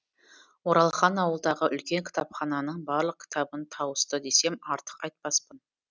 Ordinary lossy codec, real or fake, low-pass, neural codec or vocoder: none; real; none; none